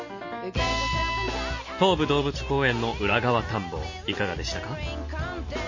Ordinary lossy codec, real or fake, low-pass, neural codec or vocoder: MP3, 32 kbps; real; 7.2 kHz; none